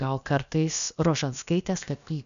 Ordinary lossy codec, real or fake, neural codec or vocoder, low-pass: MP3, 96 kbps; fake; codec, 16 kHz, about 1 kbps, DyCAST, with the encoder's durations; 7.2 kHz